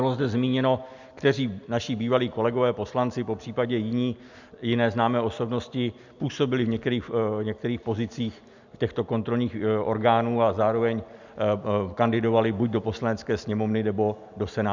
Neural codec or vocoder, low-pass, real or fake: none; 7.2 kHz; real